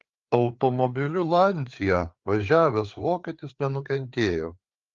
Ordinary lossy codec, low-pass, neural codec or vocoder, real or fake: Opus, 32 kbps; 7.2 kHz; codec, 16 kHz, 4 kbps, FreqCodec, larger model; fake